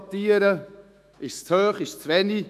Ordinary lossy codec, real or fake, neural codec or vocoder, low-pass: none; fake; autoencoder, 48 kHz, 128 numbers a frame, DAC-VAE, trained on Japanese speech; 14.4 kHz